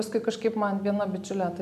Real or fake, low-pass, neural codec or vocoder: real; 14.4 kHz; none